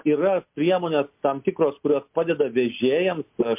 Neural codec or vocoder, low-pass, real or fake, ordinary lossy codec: none; 3.6 kHz; real; MP3, 32 kbps